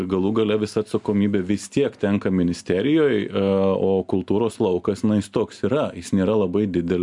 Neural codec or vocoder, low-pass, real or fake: none; 10.8 kHz; real